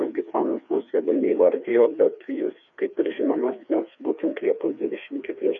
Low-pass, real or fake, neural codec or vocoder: 7.2 kHz; fake; codec, 16 kHz, 2 kbps, FreqCodec, larger model